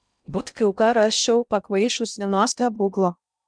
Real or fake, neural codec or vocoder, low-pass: fake; codec, 16 kHz in and 24 kHz out, 0.6 kbps, FocalCodec, streaming, 2048 codes; 9.9 kHz